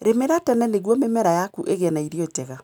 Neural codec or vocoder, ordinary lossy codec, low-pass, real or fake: none; none; none; real